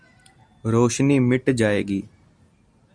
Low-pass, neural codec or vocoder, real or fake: 9.9 kHz; none; real